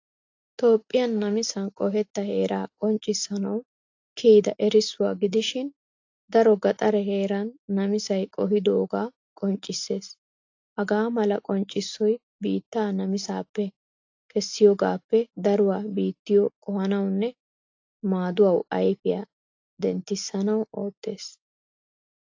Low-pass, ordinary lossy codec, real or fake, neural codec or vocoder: 7.2 kHz; AAC, 48 kbps; real; none